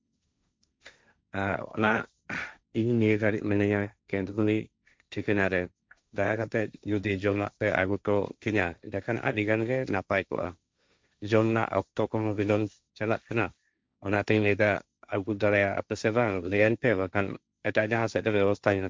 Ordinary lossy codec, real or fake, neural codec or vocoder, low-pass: none; fake; codec, 16 kHz, 1.1 kbps, Voila-Tokenizer; none